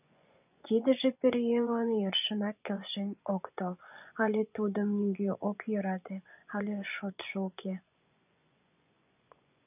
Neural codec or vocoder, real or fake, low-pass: vocoder, 24 kHz, 100 mel bands, Vocos; fake; 3.6 kHz